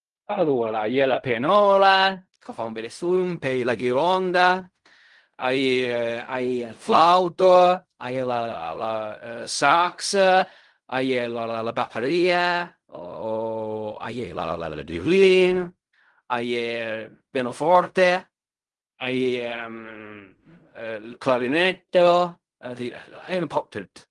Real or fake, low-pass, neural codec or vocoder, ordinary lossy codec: fake; 10.8 kHz; codec, 16 kHz in and 24 kHz out, 0.4 kbps, LongCat-Audio-Codec, fine tuned four codebook decoder; Opus, 32 kbps